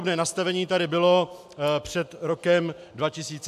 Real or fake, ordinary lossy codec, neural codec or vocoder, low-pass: real; AAC, 96 kbps; none; 14.4 kHz